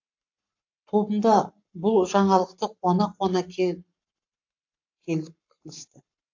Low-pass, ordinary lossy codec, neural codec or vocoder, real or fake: 7.2 kHz; AAC, 48 kbps; codec, 44.1 kHz, 7.8 kbps, Pupu-Codec; fake